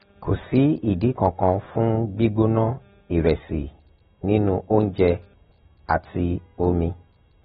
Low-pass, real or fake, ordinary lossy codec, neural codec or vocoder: 19.8 kHz; real; AAC, 16 kbps; none